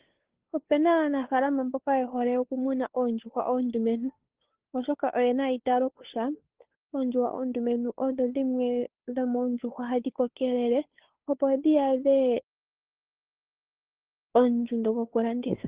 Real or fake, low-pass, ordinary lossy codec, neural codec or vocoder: fake; 3.6 kHz; Opus, 16 kbps; codec, 16 kHz, 2 kbps, FunCodec, trained on Chinese and English, 25 frames a second